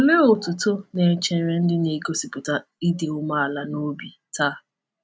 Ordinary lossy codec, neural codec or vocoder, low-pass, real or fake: none; none; none; real